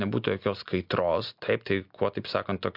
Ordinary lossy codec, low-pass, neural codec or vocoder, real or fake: MP3, 48 kbps; 5.4 kHz; none; real